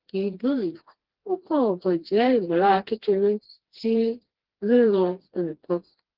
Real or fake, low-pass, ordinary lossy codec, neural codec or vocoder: fake; 5.4 kHz; Opus, 16 kbps; codec, 16 kHz, 2 kbps, FreqCodec, smaller model